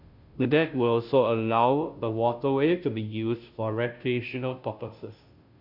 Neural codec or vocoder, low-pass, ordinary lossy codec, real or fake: codec, 16 kHz, 0.5 kbps, FunCodec, trained on Chinese and English, 25 frames a second; 5.4 kHz; none; fake